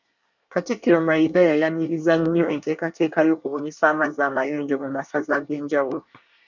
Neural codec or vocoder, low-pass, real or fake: codec, 24 kHz, 1 kbps, SNAC; 7.2 kHz; fake